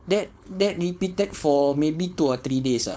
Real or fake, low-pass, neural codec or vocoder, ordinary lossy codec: fake; none; codec, 16 kHz, 4.8 kbps, FACodec; none